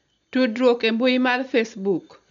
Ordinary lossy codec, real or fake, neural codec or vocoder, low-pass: MP3, 64 kbps; real; none; 7.2 kHz